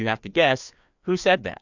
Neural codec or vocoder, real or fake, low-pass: codec, 16 kHz, 2 kbps, FreqCodec, larger model; fake; 7.2 kHz